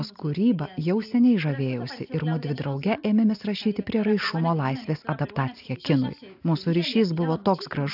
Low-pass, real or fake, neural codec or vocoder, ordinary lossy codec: 5.4 kHz; real; none; AAC, 48 kbps